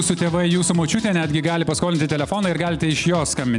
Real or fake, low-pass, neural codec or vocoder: real; 10.8 kHz; none